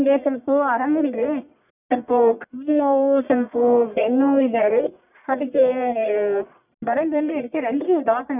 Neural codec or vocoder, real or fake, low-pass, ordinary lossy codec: codec, 44.1 kHz, 1.7 kbps, Pupu-Codec; fake; 3.6 kHz; none